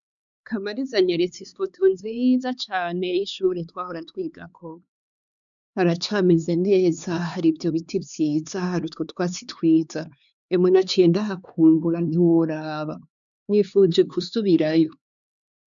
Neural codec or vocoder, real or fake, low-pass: codec, 16 kHz, 4 kbps, X-Codec, HuBERT features, trained on LibriSpeech; fake; 7.2 kHz